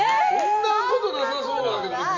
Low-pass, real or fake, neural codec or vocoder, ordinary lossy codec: 7.2 kHz; real; none; none